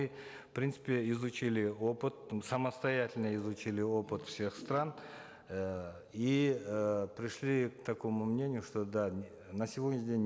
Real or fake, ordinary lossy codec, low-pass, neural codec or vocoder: real; none; none; none